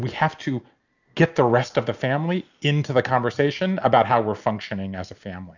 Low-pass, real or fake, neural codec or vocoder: 7.2 kHz; real; none